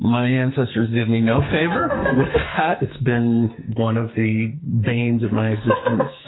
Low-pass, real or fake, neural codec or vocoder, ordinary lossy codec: 7.2 kHz; fake; codec, 44.1 kHz, 2.6 kbps, SNAC; AAC, 16 kbps